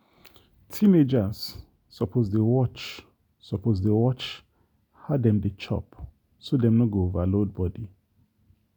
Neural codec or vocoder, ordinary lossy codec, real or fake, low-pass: none; none; real; none